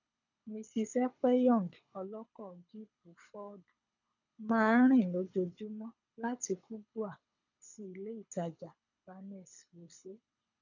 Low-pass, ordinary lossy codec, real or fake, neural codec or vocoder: 7.2 kHz; none; fake; codec, 24 kHz, 6 kbps, HILCodec